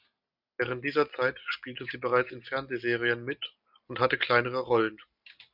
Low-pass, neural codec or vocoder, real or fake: 5.4 kHz; none; real